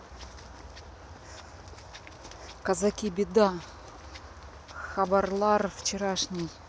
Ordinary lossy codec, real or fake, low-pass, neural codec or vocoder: none; real; none; none